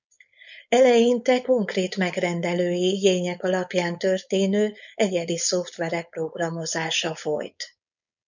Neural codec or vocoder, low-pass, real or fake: codec, 16 kHz, 4.8 kbps, FACodec; 7.2 kHz; fake